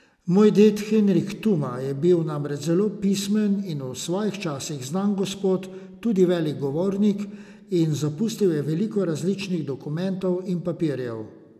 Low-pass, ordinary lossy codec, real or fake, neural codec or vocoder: 14.4 kHz; none; real; none